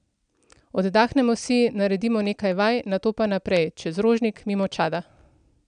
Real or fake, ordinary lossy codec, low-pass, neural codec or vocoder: real; none; 9.9 kHz; none